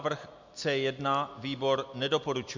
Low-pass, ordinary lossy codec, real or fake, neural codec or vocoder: 7.2 kHz; MP3, 64 kbps; real; none